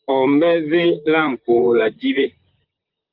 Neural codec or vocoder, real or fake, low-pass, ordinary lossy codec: vocoder, 44.1 kHz, 128 mel bands, Pupu-Vocoder; fake; 5.4 kHz; Opus, 32 kbps